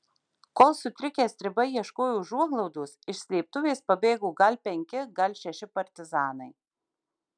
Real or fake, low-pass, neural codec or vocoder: real; 9.9 kHz; none